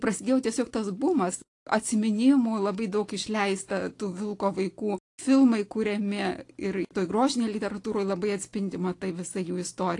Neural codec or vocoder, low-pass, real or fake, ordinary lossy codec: none; 10.8 kHz; real; AAC, 48 kbps